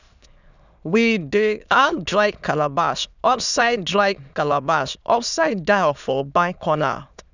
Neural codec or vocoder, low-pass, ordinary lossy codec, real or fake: autoencoder, 22.05 kHz, a latent of 192 numbers a frame, VITS, trained on many speakers; 7.2 kHz; none; fake